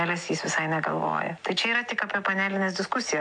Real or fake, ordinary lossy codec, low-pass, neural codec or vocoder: real; AAC, 64 kbps; 9.9 kHz; none